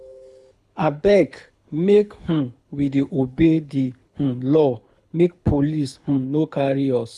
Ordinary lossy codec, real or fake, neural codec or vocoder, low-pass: none; fake; codec, 24 kHz, 6 kbps, HILCodec; none